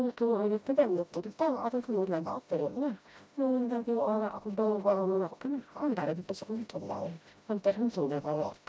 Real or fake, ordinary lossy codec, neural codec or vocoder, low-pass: fake; none; codec, 16 kHz, 0.5 kbps, FreqCodec, smaller model; none